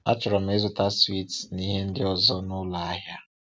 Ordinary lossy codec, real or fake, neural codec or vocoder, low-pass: none; real; none; none